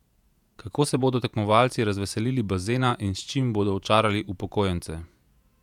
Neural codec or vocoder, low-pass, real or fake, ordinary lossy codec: vocoder, 48 kHz, 128 mel bands, Vocos; 19.8 kHz; fake; none